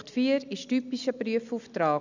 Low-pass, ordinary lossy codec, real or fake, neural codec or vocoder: 7.2 kHz; none; real; none